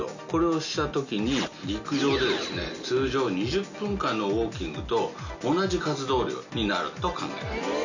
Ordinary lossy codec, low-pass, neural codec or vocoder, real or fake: none; 7.2 kHz; none; real